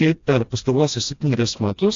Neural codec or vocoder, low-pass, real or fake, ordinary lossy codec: codec, 16 kHz, 1 kbps, FreqCodec, smaller model; 7.2 kHz; fake; AAC, 48 kbps